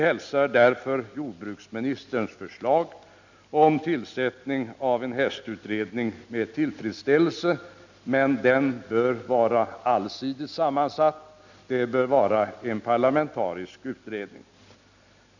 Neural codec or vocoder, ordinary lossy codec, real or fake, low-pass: none; none; real; 7.2 kHz